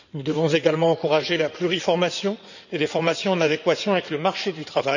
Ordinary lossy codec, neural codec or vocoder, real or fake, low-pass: none; codec, 16 kHz in and 24 kHz out, 2.2 kbps, FireRedTTS-2 codec; fake; 7.2 kHz